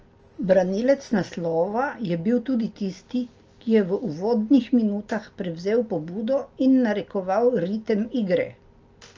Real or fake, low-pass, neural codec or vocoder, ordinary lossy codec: real; 7.2 kHz; none; Opus, 24 kbps